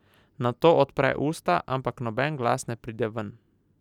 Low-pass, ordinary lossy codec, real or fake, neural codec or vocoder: 19.8 kHz; none; real; none